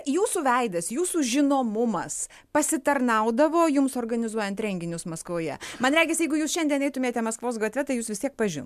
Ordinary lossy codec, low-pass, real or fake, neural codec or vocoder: MP3, 96 kbps; 14.4 kHz; real; none